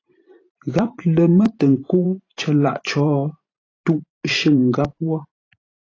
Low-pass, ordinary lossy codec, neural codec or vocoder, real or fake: 7.2 kHz; AAC, 32 kbps; vocoder, 44.1 kHz, 128 mel bands every 512 samples, BigVGAN v2; fake